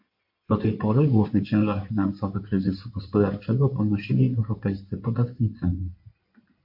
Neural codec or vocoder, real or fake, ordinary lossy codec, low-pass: codec, 16 kHz in and 24 kHz out, 2.2 kbps, FireRedTTS-2 codec; fake; MP3, 32 kbps; 5.4 kHz